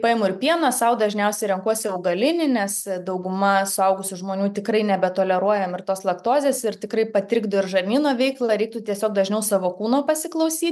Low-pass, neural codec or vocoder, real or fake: 14.4 kHz; none; real